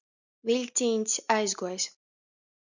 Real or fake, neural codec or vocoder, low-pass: real; none; 7.2 kHz